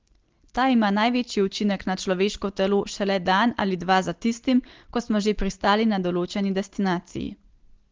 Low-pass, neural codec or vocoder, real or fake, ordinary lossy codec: 7.2 kHz; none; real; Opus, 16 kbps